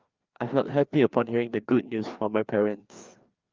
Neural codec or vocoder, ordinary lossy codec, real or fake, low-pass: codec, 16 kHz, 2 kbps, FreqCodec, larger model; Opus, 32 kbps; fake; 7.2 kHz